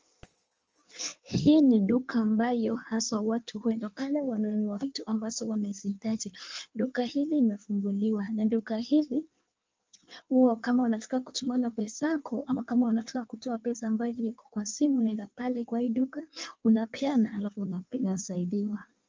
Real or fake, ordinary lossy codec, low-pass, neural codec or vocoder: fake; Opus, 24 kbps; 7.2 kHz; codec, 16 kHz in and 24 kHz out, 1.1 kbps, FireRedTTS-2 codec